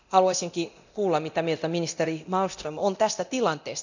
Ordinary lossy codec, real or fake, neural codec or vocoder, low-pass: none; fake; codec, 24 kHz, 0.9 kbps, DualCodec; 7.2 kHz